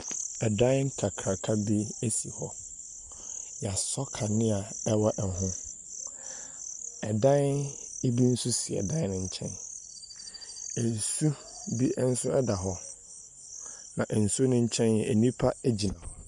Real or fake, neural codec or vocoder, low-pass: real; none; 10.8 kHz